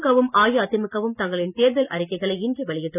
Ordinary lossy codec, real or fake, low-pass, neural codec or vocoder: none; real; 3.6 kHz; none